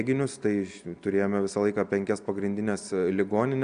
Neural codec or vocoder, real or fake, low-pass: none; real; 9.9 kHz